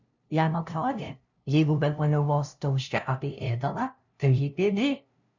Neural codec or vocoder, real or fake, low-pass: codec, 16 kHz, 0.5 kbps, FunCodec, trained on LibriTTS, 25 frames a second; fake; 7.2 kHz